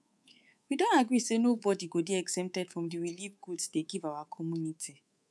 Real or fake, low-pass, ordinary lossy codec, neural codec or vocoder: fake; none; none; codec, 24 kHz, 3.1 kbps, DualCodec